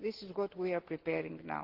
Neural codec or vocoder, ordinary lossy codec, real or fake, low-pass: none; Opus, 16 kbps; real; 5.4 kHz